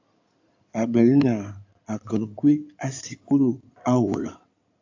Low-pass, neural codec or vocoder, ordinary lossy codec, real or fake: 7.2 kHz; codec, 16 kHz in and 24 kHz out, 2.2 kbps, FireRedTTS-2 codec; AAC, 48 kbps; fake